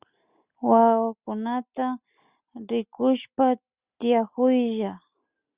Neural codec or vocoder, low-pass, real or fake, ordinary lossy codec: none; 3.6 kHz; real; Opus, 64 kbps